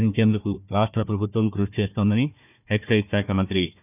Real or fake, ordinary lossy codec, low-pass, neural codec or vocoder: fake; none; 3.6 kHz; codec, 16 kHz, 1 kbps, FunCodec, trained on Chinese and English, 50 frames a second